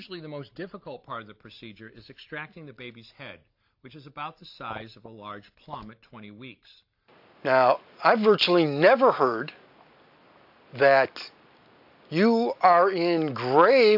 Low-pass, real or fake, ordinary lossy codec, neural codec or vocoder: 5.4 kHz; real; AAC, 48 kbps; none